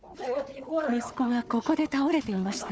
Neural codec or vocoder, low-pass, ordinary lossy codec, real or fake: codec, 16 kHz, 4 kbps, FunCodec, trained on Chinese and English, 50 frames a second; none; none; fake